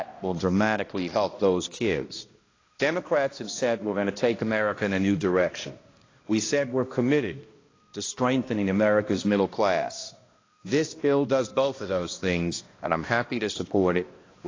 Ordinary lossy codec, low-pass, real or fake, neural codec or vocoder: AAC, 32 kbps; 7.2 kHz; fake; codec, 16 kHz, 1 kbps, X-Codec, HuBERT features, trained on balanced general audio